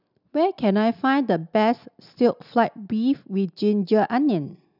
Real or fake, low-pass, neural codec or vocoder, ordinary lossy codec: real; 5.4 kHz; none; none